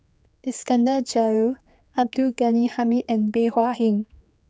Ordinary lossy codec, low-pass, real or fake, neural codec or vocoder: none; none; fake; codec, 16 kHz, 4 kbps, X-Codec, HuBERT features, trained on general audio